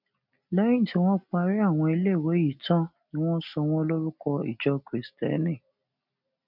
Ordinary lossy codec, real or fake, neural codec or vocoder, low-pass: none; real; none; 5.4 kHz